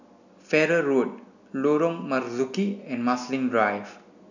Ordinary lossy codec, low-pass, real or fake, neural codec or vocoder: none; 7.2 kHz; real; none